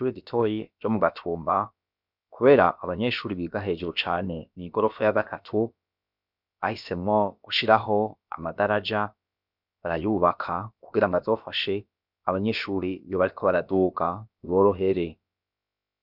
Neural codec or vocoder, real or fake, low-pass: codec, 16 kHz, about 1 kbps, DyCAST, with the encoder's durations; fake; 5.4 kHz